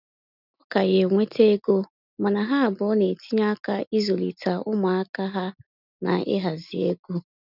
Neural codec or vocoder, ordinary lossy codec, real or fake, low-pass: none; none; real; 5.4 kHz